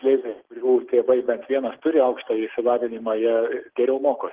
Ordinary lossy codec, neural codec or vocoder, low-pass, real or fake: Opus, 16 kbps; none; 3.6 kHz; real